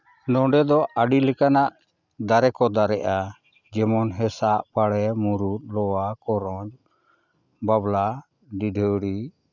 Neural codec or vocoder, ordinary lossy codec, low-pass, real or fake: none; none; 7.2 kHz; real